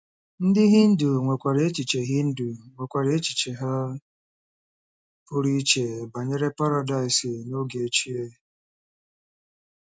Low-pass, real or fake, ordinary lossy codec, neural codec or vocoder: none; real; none; none